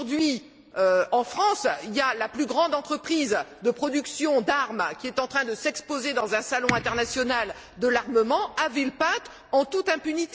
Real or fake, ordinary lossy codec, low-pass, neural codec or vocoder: real; none; none; none